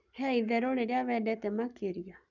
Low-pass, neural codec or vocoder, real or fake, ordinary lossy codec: 7.2 kHz; codec, 44.1 kHz, 7.8 kbps, Pupu-Codec; fake; none